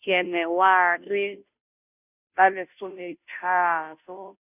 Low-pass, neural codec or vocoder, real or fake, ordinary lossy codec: 3.6 kHz; codec, 16 kHz, 0.5 kbps, FunCodec, trained on Chinese and English, 25 frames a second; fake; none